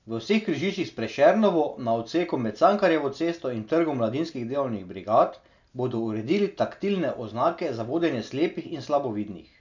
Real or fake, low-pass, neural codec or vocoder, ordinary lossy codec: real; 7.2 kHz; none; none